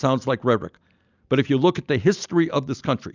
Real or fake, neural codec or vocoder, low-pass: real; none; 7.2 kHz